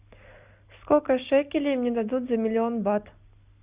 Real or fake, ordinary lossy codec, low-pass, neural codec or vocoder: real; Opus, 64 kbps; 3.6 kHz; none